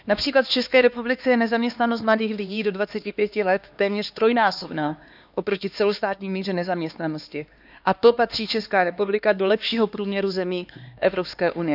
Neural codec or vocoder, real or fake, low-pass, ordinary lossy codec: codec, 16 kHz, 2 kbps, X-Codec, HuBERT features, trained on LibriSpeech; fake; 5.4 kHz; none